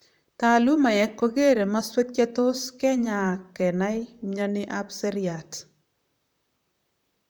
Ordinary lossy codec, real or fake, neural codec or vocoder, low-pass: none; fake; vocoder, 44.1 kHz, 128 mel bands, Pupu-Vocoder; none